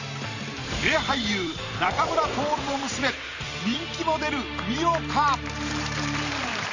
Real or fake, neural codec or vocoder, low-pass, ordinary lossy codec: fake; vocoder, 44.1 kHz, 128 mel bands every 512 samples, BigVGAN v2; 7.2 kHz; Opus, 64 kbps